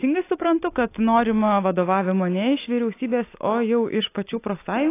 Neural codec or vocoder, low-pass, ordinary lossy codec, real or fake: none; 3.6 kHz; AAC, 24 kbps; real